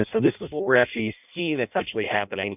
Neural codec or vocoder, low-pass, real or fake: codec, 16 kHz in and 24 kHz out, 0.6 kbps, FireRedTTS-2 codec; 3.6 kHz; fake